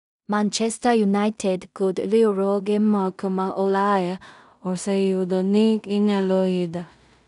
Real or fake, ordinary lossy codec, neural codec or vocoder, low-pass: fake; none; codec, 16 kHz in and 24 kHz out, 0.4 kbps, LongCat-Audio-Codec, two codebook decoder; 10.8 kHz